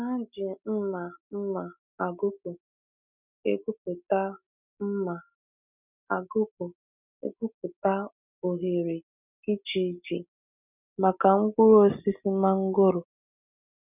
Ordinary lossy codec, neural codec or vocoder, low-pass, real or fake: none; none; 3.6 kHz; real